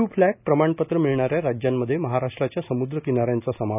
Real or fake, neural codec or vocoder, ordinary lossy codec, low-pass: real; none; none; 3.6 kHz